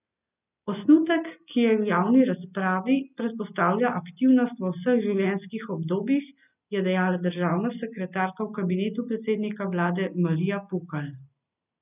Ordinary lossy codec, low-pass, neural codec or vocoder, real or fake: none; 3.6 kHz; none; real